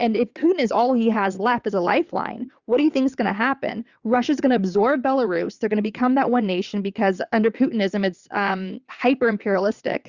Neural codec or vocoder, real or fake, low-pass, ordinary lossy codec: vocoder, 22.05 kHz, 80 mel bands, WaveNeXt; fake; 7.2 kHz; Opus, 64 kbps